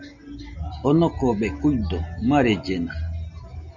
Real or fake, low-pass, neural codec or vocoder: real; 7.2 kHz; none